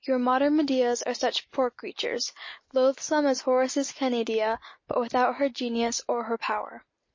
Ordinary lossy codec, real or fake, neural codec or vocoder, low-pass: MP3, 32 kbps; real; none; 7.2 kHz